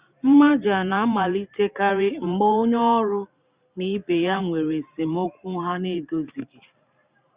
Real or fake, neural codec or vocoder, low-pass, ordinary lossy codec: fake; vocoder, 44.1 kHz, 128 mel bands every 512 samples, BigVGAN v2; 3.6 kHz; Opus, 64 kbps